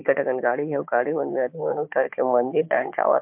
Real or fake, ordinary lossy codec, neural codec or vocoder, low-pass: fake; MP3, 32 kbps; codec, 16 kHz, 4 kbps, FunCodec, trained on Chinese and English, 50 frames a second; 3.6 kHz